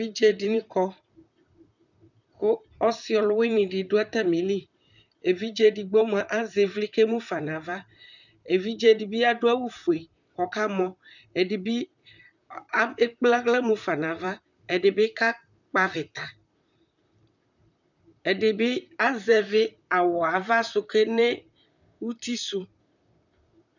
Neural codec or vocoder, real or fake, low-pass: vocoder, 44.1 kHz, 128 mel bands, Pupu-Vocoder; fake; 7.2 kHz